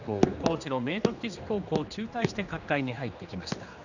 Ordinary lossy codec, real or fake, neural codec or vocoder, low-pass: none; fake; codec, 16 kHz, 2 kbps, X-Codec, HuBERT features, trained on general audio; 7.2 kHz